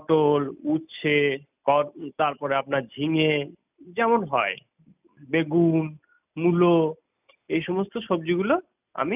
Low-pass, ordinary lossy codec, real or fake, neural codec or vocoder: 3.6 kHz; none; real; none